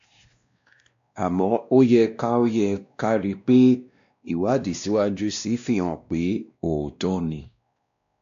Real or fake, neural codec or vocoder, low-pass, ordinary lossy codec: fake; codec, 16 kHz, 1 kbps, X-Codec, WavLM features, trained on Multilingual LibriSpeech; 7.2 kHz; AAC, 64 kbps